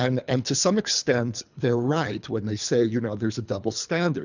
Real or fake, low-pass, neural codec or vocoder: fake; 7.2 kHz; codec, 24 kHz, 3 kbps, HILCodec